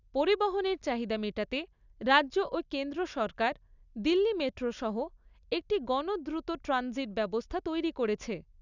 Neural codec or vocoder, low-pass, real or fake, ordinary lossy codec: none; 7.2 kHz; real; none